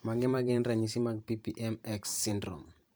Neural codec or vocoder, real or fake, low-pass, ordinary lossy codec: vocoder, 44.1 kHz, 128 mel bands every 512 samples, BigVGAN v2; fake; none; none